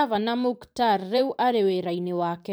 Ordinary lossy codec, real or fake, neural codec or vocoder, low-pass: none; fake; vocoder, 44.1 kHz, 128 mel bands every 512 samples, BigVGAN v2; none